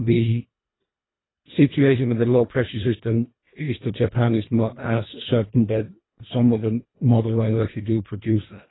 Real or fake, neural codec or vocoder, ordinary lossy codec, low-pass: fake; codec, 24 kHz, 1.5 kbps, HILCodec; AAC, 16 kbps; 7.2 kHz